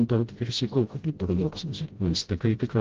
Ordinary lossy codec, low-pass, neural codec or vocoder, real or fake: Opus, 16 kbps; 7.2 kHz; codec, 16 kHz, 0.5 kbps, FreqCodec, smaller model; fake